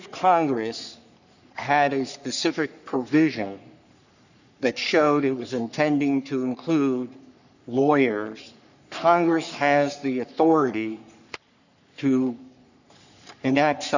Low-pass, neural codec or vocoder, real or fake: 7.2 kHz; codec, 44.1 kHz, 3.4 kbps, Pupu-Codec; fake